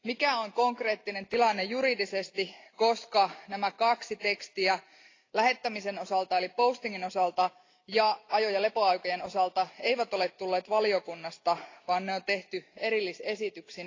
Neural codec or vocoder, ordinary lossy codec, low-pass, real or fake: none; AAC, 32 kbps; 7.2 kHz; real